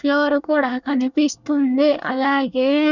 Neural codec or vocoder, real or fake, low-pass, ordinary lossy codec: codec, 24 kHz, 1 kbps, SNAC; fake; 7.2 kHz; none